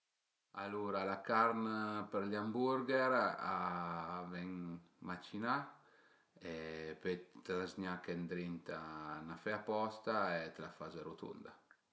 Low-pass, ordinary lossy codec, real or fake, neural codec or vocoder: none; none; real; none